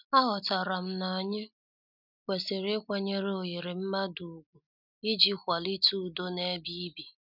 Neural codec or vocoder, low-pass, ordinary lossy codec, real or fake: none; 5.4 kHz; none; real